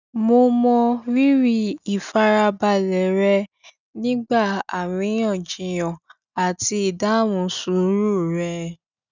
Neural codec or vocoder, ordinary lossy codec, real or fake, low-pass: none; none; real; 7.2 kHz